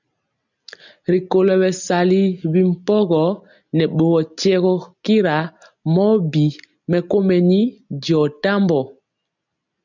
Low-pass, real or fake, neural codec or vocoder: 7.2 kHz; real; none